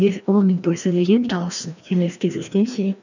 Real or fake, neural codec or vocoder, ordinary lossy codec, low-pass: fake; codec, 16 kHz, 1 kbps, FreqCodec, larger model; none; 7.2 kHz